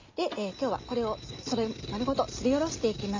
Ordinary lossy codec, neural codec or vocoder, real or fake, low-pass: MP3, 48 kbps; none; real; 7.2 kHz